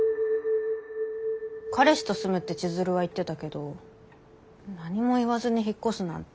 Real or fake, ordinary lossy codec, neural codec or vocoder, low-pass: real; none; none; none